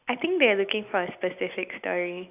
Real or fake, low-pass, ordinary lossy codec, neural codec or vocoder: real; 3.6 kHz; none; none